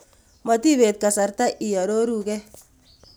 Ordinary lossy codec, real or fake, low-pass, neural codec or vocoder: none; real; none; none